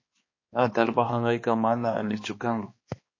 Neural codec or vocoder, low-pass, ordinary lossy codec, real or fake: codec, 16 kHz, 2 kbps, X-Codec, HuBERT features, trained on balanced general audio; 7.2 kHz; MP3, 32 kbps; fake